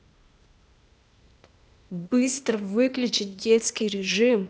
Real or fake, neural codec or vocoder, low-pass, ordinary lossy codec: fake; codec, 16 kHz, 0.8 kbps, ZipCodec; none; none